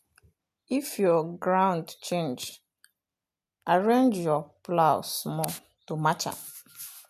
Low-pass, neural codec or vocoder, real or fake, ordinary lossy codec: 14.4 kHz; none; real; none